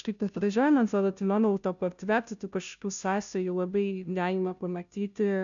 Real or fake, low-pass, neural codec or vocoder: fake; 7.2 kHz; codec, 16 kHz, 0.5 kbps, FunCodec, trained on Chinese and English, 25 frames a second